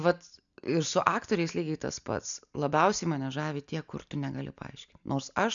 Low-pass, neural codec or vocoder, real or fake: 7.2 kHz; none; real